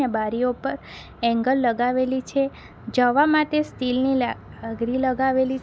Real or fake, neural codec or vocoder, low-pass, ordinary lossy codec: real; none; none; none